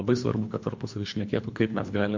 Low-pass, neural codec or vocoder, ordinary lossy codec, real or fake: 7.2 kHz; codec, 24 kHz, 3 kbps, HILCodec; MP3, 48 kbps; fake